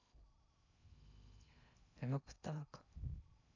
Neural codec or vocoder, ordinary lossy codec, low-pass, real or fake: codec, 16 kHz in and 24 kHz out, 0.6 kbps, FocalCodec, streaming, 2048 codes; none; 7.2 kHz; fake